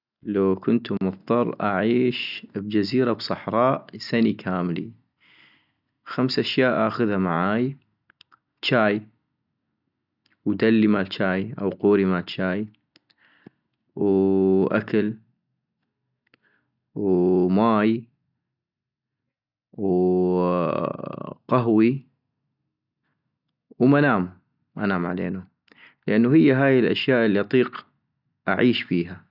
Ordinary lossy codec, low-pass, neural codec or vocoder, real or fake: none; 5.4 kHz; none; real